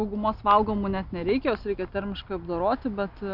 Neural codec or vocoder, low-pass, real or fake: none; 5.4 kHz; real